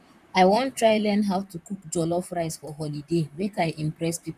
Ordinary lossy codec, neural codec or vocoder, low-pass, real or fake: none; vocoder, 44.1 kHz, 128 mel bands, Pupu-Vocoder; 14.4 kHz; fake